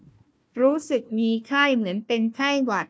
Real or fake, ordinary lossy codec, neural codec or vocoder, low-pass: fake; none; codec, 16 kHz, 1 kbps, FunCodec, trained on Chinese and English, 50 frames a second; none